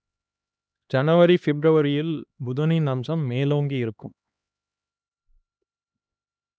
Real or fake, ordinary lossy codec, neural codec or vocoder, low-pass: fake; none; codec, 16 kHz, 2 kbps, X-Codec, HuBERT features, trained on LibriSpeech; none